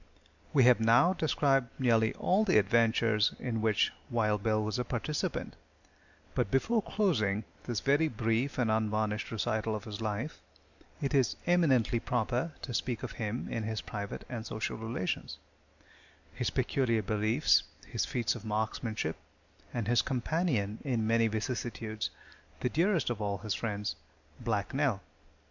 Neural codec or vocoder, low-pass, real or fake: none; 7.2 kHz; real